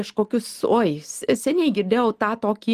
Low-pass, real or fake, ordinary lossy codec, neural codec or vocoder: 14.4 kHz; real; Opus, 24 kbps; none